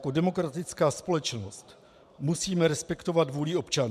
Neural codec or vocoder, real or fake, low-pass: vocoder, 44.1 kHz, 128 mel bands every 512 samples, BigVGAN v2; fake; 14.4 kHz